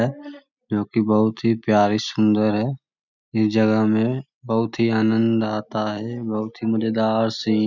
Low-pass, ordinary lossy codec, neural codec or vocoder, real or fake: 7.2 kHz; none; none; real